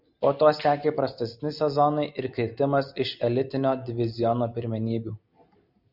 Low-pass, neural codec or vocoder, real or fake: 5.4 kHz; none; real